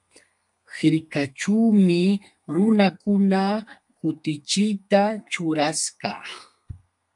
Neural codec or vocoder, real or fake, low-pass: codec, 32 kHz, 1.9 kbps, SNAC; fake; 10.8 kHz